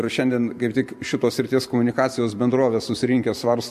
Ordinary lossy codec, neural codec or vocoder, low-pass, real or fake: MP3, 64 kbps; vocoder, 44.1 kHz, 128 mel bands every 512 samples, BigVGAN v2; 14.4 kHz; fake